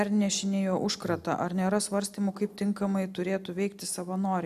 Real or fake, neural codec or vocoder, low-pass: real; none; 14.4 kHz